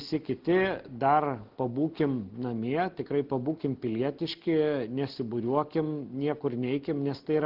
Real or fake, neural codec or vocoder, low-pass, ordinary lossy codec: real; none; 5.4 kHz; Opus, 16 kbps